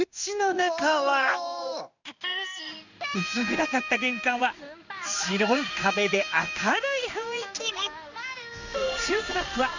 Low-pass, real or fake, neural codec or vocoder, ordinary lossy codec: 7.2 kHz; fake; codec, 16 kHz in and 24 kHz out, 1 kbps, XY-Tokenizer; none